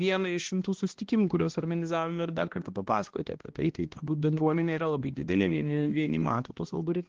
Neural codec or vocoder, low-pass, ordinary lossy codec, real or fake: codec, 16 kHz, 1 kbps, X-Codec, HuBERT features, trained on balanced general audio; 7.2 kHz; Opus, 16 kbps; fake